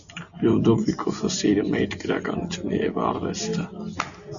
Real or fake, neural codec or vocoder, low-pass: real; none; 7.2 kHz